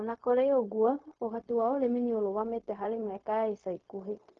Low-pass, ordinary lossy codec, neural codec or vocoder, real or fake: 7.2 kHz; Opus, 32 kbps; codec, 16 kHz, 0.4 kbps, LongCat-Audio-Codec; fake